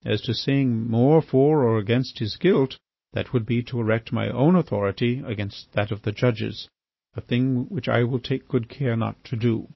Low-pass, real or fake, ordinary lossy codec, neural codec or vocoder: 7.2 kHz; real; MP3, 24 kbps; none